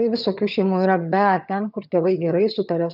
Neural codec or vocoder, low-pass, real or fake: vocoder, 22.05 kHz, 80 mel bands, HiFi-GAN; 5.4 kHz; fake